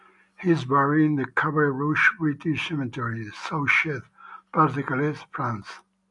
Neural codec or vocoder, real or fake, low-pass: none; real; 10.8 kHz